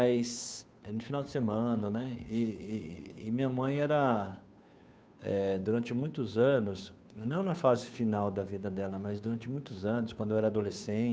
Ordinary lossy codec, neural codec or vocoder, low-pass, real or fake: none; codec, 16 kHz, 2 kbps, FunCodec, trained on Chinese and English, 25 frames a second; none; fake